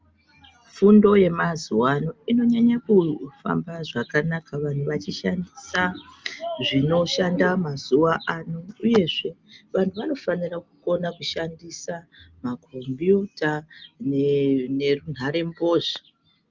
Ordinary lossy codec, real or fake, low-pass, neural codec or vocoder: Opus, 24 kbps; real; 7.2 kHz; none